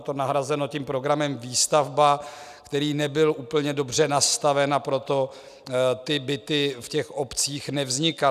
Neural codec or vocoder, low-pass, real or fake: none; 14.4 kHz; real